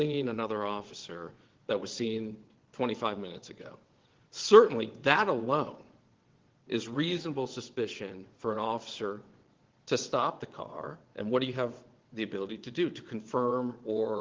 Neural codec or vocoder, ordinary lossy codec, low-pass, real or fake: vocoder, 22.05 kHz, 80 mel bands, WaveNeXt; Opus, 16 kbps; 7.2 kHz; fake